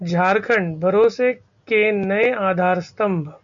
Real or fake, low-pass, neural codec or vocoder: real; 7.2 kHz; none